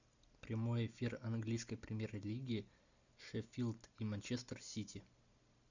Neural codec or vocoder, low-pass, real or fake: none; 7.2 kHz; real